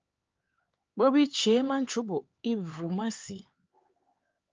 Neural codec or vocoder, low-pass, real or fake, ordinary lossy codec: codec, 16 kHz, 4 kbps, X-Codec, HuBERT features, trained on LibriSpeech; 7.2 kHz; fake; Opus, 24 kbps